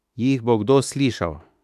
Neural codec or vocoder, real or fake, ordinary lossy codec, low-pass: autoencoder, 48 kHz, 32 numbers a frame, DAC-VAE, trained on Japanese speech; fake; none; 14.4 kHz